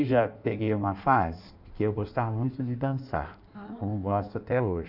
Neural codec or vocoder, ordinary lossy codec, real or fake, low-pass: codec, 16 kHz in and 24 kHz out, 1.1 kbps, FireRedTTS-2 codec; none; fake; 5.4 kHz